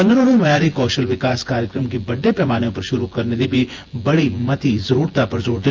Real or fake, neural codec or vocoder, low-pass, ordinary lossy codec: fake; vocoder, 24 kHz, 100 mel bands, Vocos; 7.2 kHz; Opus, 32 kbps